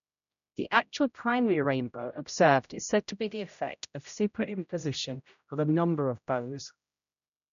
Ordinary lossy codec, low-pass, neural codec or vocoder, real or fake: none; 7.2 kHz; codec, 16 kHz, 0.5 kbps, X-Codec, HuBERT features, trained on general audio; fake